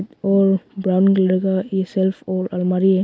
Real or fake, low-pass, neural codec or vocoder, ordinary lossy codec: real; none; none; none